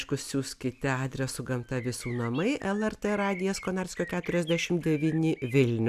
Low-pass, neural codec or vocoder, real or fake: 14.4 kHz; none; real